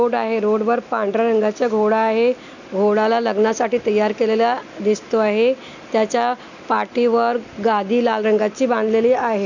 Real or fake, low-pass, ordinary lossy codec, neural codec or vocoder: real; 7.2 kHz; none; none